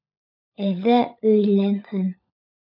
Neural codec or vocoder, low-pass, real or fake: codec, 16 kHz, 16 kbps, FunCodec, trained on LibriTTS, 50 frames a second; 5.4 kHz; fake